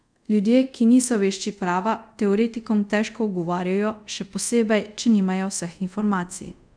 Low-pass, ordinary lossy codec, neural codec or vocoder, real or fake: 9.9 kHz; none; codec, 24 kHz, 0.5 kbps, DualCodec; fake